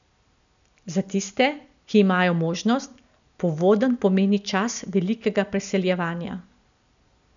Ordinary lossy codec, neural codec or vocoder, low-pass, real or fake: none; none; 7.2 kHz; real